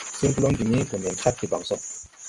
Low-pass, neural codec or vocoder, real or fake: 10.8 kHz; none; real